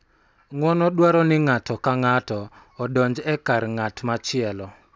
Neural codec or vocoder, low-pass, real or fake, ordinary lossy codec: none; none; real; none